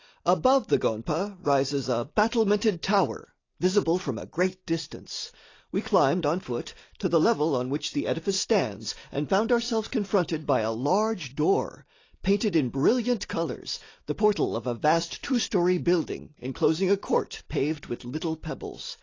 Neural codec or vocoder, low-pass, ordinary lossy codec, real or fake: none; 7.2 kHz; AAC, 32 kbps; real